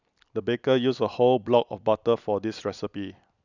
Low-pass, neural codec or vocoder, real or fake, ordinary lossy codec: 7.2 kHz; none; real; none